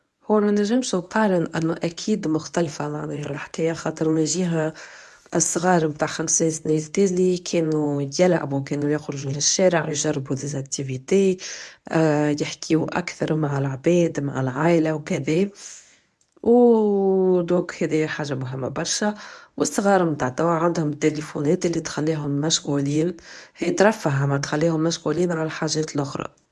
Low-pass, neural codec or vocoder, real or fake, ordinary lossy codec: none; codec, 24 kHz, 0.9 kbps, WavTokenizer, medium speech release version 1; fake; none